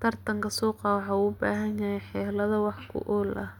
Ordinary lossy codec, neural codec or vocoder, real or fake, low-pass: none; none; real; 19.8 kHz